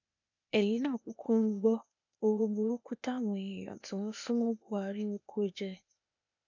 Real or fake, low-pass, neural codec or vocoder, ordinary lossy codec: fake; 7.2 kHz; codec, 16 kHz, 0.8 kbps, ZipCodec; none